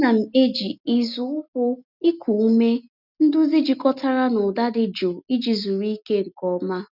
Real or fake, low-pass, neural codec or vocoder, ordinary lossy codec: real; 5.4 kHz; none; none